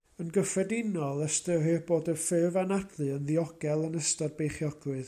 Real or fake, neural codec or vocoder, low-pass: real; none; 14.4 kHz